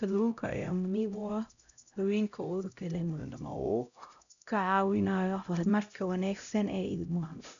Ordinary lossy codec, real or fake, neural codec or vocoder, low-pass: none; fake; codec, 16 kHz, 0.5 kbps, X-Codec, HuBERT features, trained on LibriSpeech; 7.2 kHz